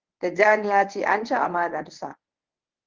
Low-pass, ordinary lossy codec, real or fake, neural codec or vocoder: 7.2 kHz; Opus, 16 kbps; fake; codec, 24 kHz, 0.9 kbps, WavTokenizer, medium speech release version 1